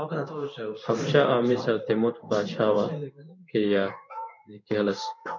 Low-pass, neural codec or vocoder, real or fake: 7.2 kHz; codec, 16 kHz in and 24 kHz out, 1 kbps, XY-Tokenizer; fake